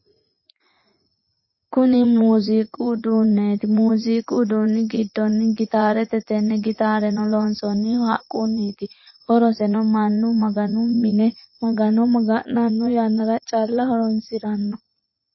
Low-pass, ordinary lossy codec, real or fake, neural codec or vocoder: 7.2 kHz; MP3, 24 kbps; fake; vocoder, 44.1 kHz, 80 mel bands, Vocos